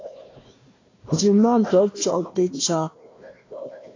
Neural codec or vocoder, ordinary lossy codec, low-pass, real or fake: codec, 16 kHz, 1 kbps, FunCodec, trained on Chinese and English, 50 frames a second; AAC, 32 kbps; 7.2 kHz; fake